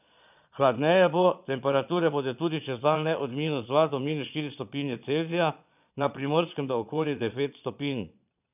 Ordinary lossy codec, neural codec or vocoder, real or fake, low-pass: none; vocoder, 22.05 kHz, 80 mel bands, Vocos; fake; 3.6 kHz